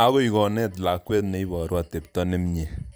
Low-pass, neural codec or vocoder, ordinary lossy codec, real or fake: none; vocoder, 44.1 kHz, 128 mel bands every 256 samples, BigVGAN v2; none; fake